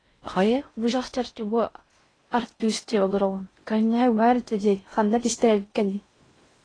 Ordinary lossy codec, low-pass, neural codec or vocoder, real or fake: AAC, 32 kbps; 9.9 kHz; codec, 16 kHz in and 24 kHz out, 0.6 kbps, FocalCodec, streaming, 4096 codes; fake